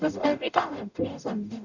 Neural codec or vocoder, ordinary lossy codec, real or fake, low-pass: codec, 44.1 kHz, 0.9 kbps, DAC; none; fake; 7.2 kHz